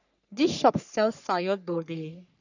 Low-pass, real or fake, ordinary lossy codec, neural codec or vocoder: 7.2 kHz; fake; none; codec, 44.1 kHz, 3.4 kbps, Pupu-Codec